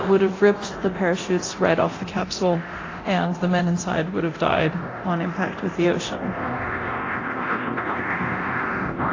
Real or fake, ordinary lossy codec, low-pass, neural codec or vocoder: fake; AAC, 32 kbps; 7.2 kHz; codec, 24 kHz, 0.9 kbps, DualCodec